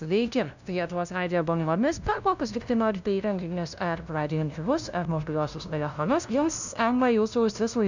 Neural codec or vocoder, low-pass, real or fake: codec, 16 kHz, 0.5 kbps, FunCodec, trained on LibriTTS, 25 frames a second; 7.2 kHz; fake